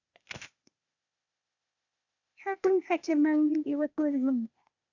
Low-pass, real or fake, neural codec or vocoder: 7.2 kHz; fake; codec, 16 kHz, 0.8 kbps, ZipCodec